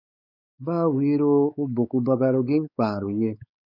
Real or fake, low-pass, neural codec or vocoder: fake; 5.4 kHz; codec, 16 kHz, 4 kbps, X-Codec, WavLM features, trained on Multilingual LibriSpeech